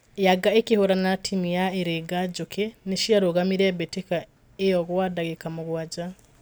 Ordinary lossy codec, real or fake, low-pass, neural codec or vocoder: none; real; none; none